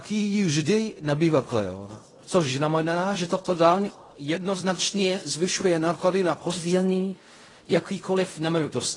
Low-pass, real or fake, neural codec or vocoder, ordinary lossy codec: 10.8 kHz; fake; codec, 16 kHz in and 24 kHz out, 0.4 kbps, LongCat-Audio-Codec, fine tuned four codebook decoder; AAC, 32 kbps